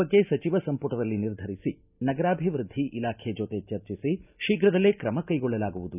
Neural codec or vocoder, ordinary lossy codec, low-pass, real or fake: none; none; 3.6 kHz; real